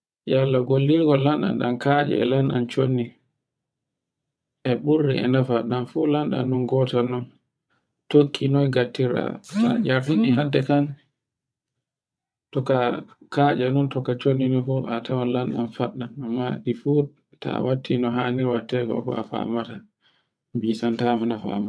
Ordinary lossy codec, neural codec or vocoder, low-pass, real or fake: none; vocoder, 22.05 kHz, 80 mel bands, WaveNeXt; none; fake